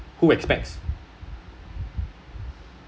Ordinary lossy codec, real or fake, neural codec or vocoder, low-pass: none; real; none; none